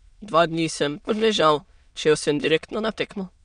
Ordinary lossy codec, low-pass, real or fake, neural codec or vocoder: none; 9.9 kHz; fake; autoencoder, 22.05 kHz, a latent of 192 numbers a frame, VITS, trained on many speakers